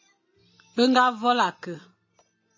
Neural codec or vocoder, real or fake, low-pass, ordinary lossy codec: none; real; 7.2 kHz; MP3, 32 kbps